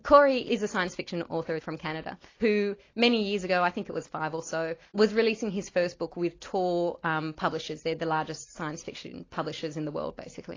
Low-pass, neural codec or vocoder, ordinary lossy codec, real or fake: 7.2 kHz; none; AAC, 32 kbps; real